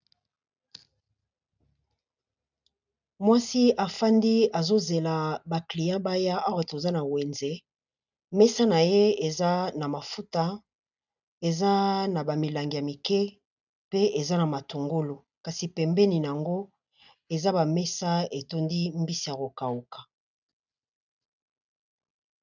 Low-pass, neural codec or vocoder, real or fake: 7.2 kHz; none; real